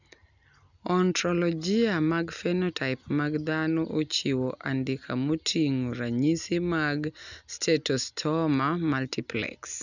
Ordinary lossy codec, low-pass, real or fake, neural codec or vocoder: none; 7.2 kHz; real; none